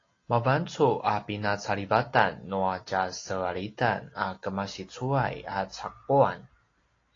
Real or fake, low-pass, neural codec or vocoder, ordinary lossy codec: real; 7.2 kHz; none; AAC, 32 kbps